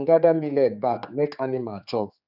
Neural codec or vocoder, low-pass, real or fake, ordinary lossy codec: codec, 16 kHz, 4 kbps, X-Codec, HuBERT features, trained on general audio; 5.4 kHz; fake; none